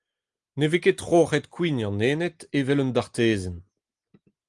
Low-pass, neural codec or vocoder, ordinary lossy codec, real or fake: 10.8 kHz; none; Opus, 32 kbps; real